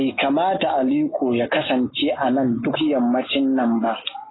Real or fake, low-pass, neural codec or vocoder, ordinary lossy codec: real; 7.2 kHz; none; AAC, 16 kbps